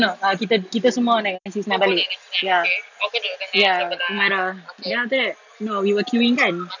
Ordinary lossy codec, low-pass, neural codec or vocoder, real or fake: none; 7.2 kHz; none; real